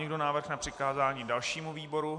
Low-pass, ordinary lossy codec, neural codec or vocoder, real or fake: 10.8 kHz; AAC, 64 kbps; none; real